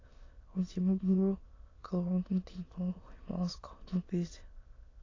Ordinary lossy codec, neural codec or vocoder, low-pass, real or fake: AAC, 32 kbps; autoencoder, 22.05 kHz, a latent of 192 numbers a frame, VITS, trained on many speakers; 7.2 kHz; fake